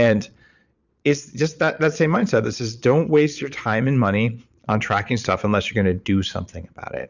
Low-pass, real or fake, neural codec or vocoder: 7.2 kHz; fake; vocoder, 22.05 kHz, 80 mel bands, Vocos